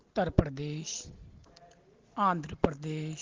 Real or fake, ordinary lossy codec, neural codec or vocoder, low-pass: real; Opus, 16 kbps; none; 7.2 kHz